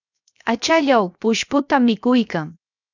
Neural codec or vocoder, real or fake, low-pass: codec, 16 kHz, 0.7 kbps, FocalCodec; fake; 7.2 kHz